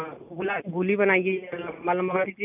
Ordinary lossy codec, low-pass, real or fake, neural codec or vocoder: none; 3.6 kHz; real; none